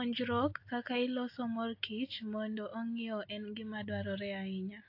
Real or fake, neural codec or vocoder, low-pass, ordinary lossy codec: real; none; 5.4 kHz; AAC, 32 kbps